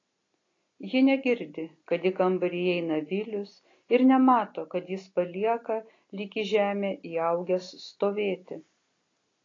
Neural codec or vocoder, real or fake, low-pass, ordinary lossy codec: none; real; 7.2 kHz; AAC, 32 kbps